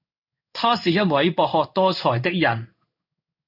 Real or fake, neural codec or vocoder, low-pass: fake; codec, 16 kHz in and 24 kHz out, 1 kbps, XY-Tokenizer; 5.4 kHz